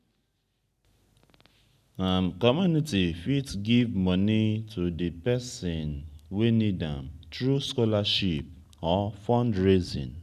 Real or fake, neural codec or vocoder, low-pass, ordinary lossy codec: real; none; 14.4 kHz; none